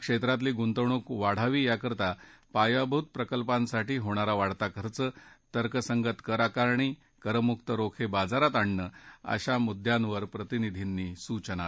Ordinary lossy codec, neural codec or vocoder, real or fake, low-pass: none; none; real; none